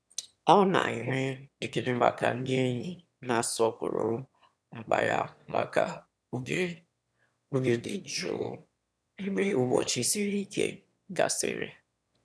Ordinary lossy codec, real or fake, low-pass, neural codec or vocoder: none; fake; none; autoencoder, 22.05 kHz, a latent of 192 numbers a frame, VITS, trained on one speaker